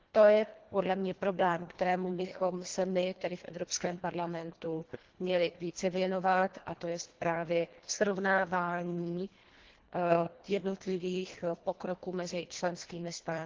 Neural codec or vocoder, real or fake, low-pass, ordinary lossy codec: codec, 24 kHz, 1.5 kbps, HILCodec; fake; 7.2 kHz; Opus, 16 kbps